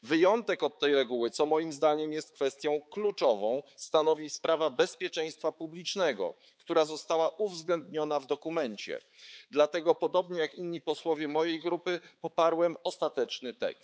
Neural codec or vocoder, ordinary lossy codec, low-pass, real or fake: codec, 16 kHz, 4 kbps, X-Codec, HuBERT features, trained on balanced general audio; none; none; fake